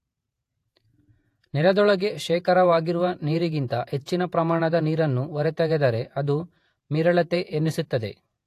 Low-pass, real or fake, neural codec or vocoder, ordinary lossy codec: 14.4 kHz; fake; vocoder, 48 kHz, 128 mel bands, Vocos; AAC, 64 kbps